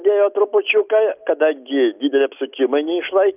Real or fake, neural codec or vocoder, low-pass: real; none; 3.6 kHz